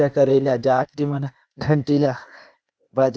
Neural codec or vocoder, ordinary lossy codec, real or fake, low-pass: codec, 16 kHz, 0.8 kbps, ZipCodec; none; fake; none